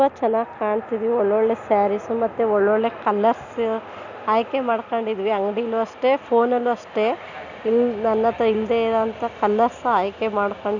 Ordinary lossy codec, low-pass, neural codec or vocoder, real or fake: none; 7.2 kHz; none; real